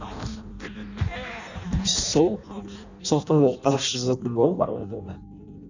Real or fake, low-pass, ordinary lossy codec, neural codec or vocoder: fake; 7.2 kHz; none; codec, 16 kHz in and 24 kHz out, 0.6 kbps, FireRedTTS-2 codec